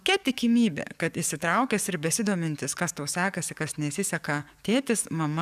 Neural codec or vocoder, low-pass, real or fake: codec, 44.1 kHz, 7.8 kbps, DAC; 14.4 kHz; fake